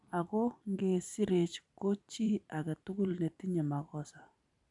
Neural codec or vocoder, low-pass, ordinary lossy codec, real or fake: vocoder, 44.1 kHz, 128 mel bands every 512 samples, BigVGAN v2; 10.8 kHz; none; fake